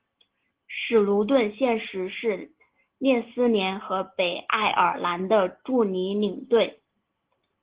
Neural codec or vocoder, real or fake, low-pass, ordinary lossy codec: none; real; 3.6 kHz; Opus, 16 kbps